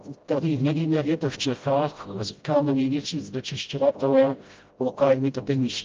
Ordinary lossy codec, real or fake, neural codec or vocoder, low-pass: Opus, 24 kbps; fake; codec, 16 kHz, 0.5 kbps, FreqCodec, smaller model; 7.2 kHz